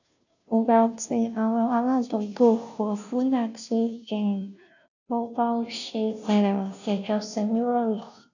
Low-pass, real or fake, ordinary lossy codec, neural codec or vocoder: 7.2 kHz; fake; none; codec, 16 kHz, 0.5 kbps, FunCodec, trained on Chinese and English, 25 frames a second